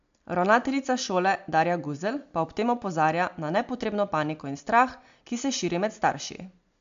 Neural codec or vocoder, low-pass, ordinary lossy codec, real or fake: none; 7.2 kHz; AAC, 64 kbps; real